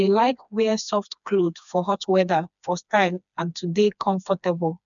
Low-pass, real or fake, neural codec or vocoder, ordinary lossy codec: 7.2 kHz; fake; codec, 16 kHz, 2 kbps, FreqCodec, smaller model; none